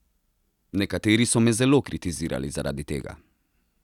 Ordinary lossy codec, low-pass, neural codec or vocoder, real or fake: none; 19.8 kHz; none; real